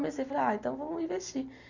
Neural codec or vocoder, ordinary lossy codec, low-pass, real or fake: none; none; 7.2 kHz; real